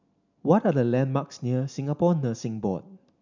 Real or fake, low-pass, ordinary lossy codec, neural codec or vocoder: real; 7.2 kHz; none; none